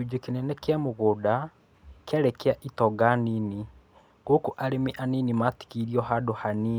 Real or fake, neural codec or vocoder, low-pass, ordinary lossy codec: real; none; none; none